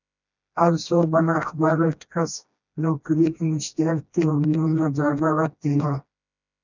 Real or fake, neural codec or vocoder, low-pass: fake; codec, 16 kHz, 1 kbps, FreqCodec, smaller model; 7.2 kHz